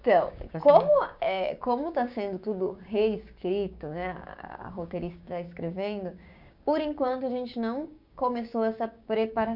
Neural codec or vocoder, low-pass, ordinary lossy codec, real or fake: codec, 16 kHz, 6 kbps, DAC; 5.4 kHz; none; fake